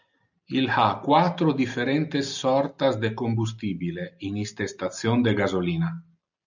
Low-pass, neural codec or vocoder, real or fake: 7.2 kHz; none; real